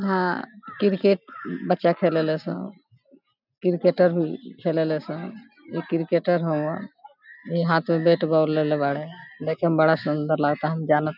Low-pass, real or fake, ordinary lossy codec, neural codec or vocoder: 5.4 kHz; real; none; none